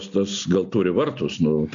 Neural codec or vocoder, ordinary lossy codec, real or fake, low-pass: none; AAC, 64 kbps; real; 7.2 kHz